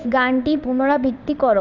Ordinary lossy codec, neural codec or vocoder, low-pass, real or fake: none; codec, 16 kHz in and 24 kHz out, 1 kbps, XY-Tokenizer; 7.2 kHz; fake